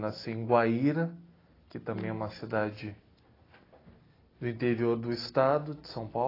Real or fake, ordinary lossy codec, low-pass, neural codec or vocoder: real; AAC, 24 kbps; 5.4 kHz; none